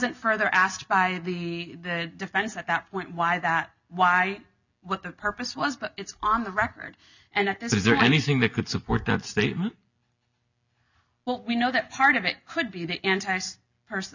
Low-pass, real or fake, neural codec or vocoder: 7.2 kHz; real; none